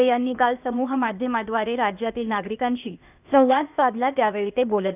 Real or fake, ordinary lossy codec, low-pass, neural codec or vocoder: fake; none; 3.6 kHz; codec, 16 kHz, 0.8 kbps, ZipCodec